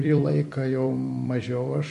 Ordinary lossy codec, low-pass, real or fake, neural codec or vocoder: MP3, 48 kbps; 14.4 kHz; fake; vocoder, 44.1 kHz, 128 mel bands every 256 samples, BigVGAN v2